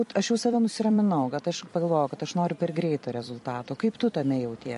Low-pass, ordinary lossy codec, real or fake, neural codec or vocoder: 14.4 kHz; MP3, 48 kbps; fake; vocoder, 44.1 kHz, 128 mel bands every 256 samples, BigVGAN v2